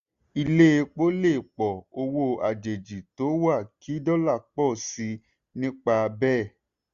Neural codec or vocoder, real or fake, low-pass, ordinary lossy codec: none; real; 7.2 kHz; Opus, 64 kbps